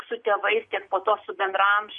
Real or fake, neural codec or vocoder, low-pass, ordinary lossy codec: real; none; 10.8 kHz; MP3, 48 kbps